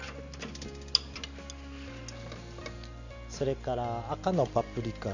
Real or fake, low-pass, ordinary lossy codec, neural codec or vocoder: real; 7.2 kHz; none; none